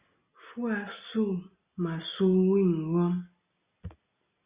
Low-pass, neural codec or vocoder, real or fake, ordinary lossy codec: 3.6 kHz; none; real; Opus, 64 kbps